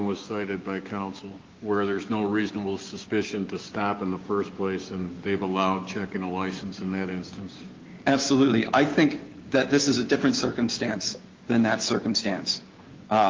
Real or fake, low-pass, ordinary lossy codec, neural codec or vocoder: fake; 7.2 kHz; Opus, 32 kbps; codec, 16 kHz, 6 kbps, DAC